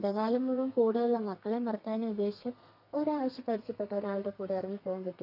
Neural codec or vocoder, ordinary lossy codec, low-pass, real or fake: codec, 32 kHz, 1.9 kbps, SNAC; none; 5.4 kHz; fake